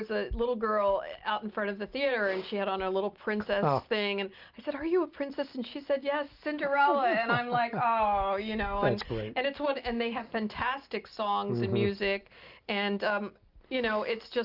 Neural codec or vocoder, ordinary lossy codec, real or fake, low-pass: none; Opus, 24 kbps; real; 5.4 kHz